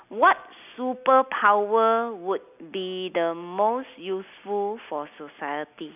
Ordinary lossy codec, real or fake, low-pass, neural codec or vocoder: none; real; 3.6 kHz; none